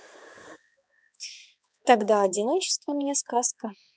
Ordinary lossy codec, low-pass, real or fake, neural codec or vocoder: none; none; fake; codec, 16 kHz, 4 kbps, X-Codec, HuBERT features, trained on balanced general audio